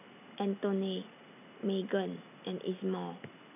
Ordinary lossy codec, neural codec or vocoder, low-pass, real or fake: none; none; 3.6 kHz; real